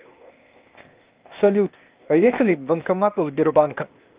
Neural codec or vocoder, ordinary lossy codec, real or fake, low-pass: codec, 16 kHz, 0.8 kbps, ZipCodec; Opus, 32 kbps; fake; 3.6 kHz